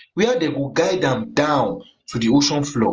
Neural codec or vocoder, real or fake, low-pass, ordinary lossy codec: none; real; 7.2 kHz; Opus, 24 kbps